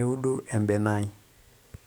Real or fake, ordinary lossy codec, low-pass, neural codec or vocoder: fake; none; none; codec, 44.1 kHz, 7.8 kbps, DAC